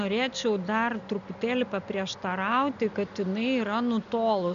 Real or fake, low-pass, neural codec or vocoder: real; 7.2 kHz; none